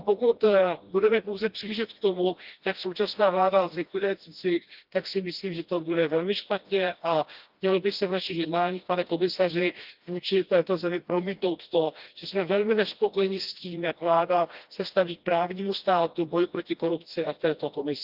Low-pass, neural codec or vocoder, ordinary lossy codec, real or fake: 5.4 kHz; codec, 16 kHz, 1 kbps, FreqCodec, smaller model; Opus, 32 kbps; fake